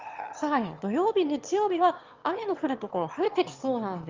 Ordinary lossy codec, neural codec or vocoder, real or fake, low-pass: Opus, 32 kbps; autoencoder, 22.05 kHz, a latent of 192 numbers a frame, VITS, trained on one speaker; fake; 7.2 kHz